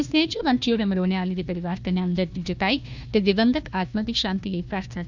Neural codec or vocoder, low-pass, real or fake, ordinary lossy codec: codec, 16 kHz, 1 kbps, FunCodec, trained on Chinese and English, 50 frames a second; 7.2 kHz; fake; none